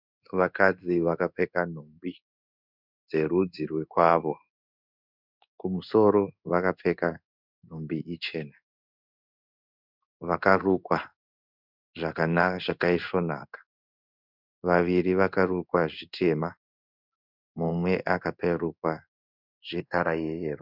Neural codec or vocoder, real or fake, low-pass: codec, 16 kHz in and 24 kHz out, 1 kbps, XY-Tokenizer; fake; 5.4 kHz